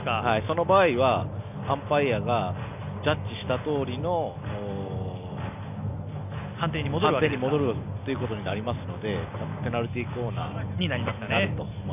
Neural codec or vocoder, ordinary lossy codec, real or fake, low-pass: none; none; real; 3.6 kHz